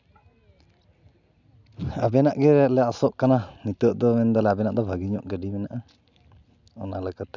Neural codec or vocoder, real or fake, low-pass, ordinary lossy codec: none; real; 7.2 kHz; none